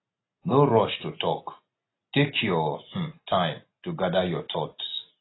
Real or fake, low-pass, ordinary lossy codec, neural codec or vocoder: real; 7.2 kHz; AAC, 16 kbps; none